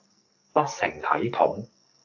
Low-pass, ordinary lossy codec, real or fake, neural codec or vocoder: 7.2 kHz; AAC, 48 kbps; fake; codec, 44.1 kHz, 2.6 kbps, SNAC